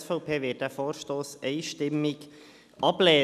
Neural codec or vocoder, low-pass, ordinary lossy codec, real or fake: none; 14.4 kHz; none; real